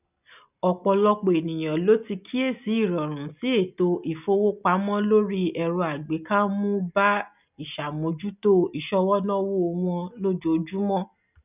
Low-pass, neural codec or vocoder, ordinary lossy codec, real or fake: 3.6 kHz; none; none; real